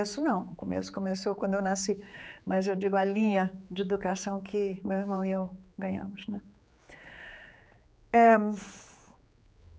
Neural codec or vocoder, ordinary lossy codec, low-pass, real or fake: codec, 16 kHz, 4 kbps, X-Codec, HuBERT features, trained on general audio; none; none; fake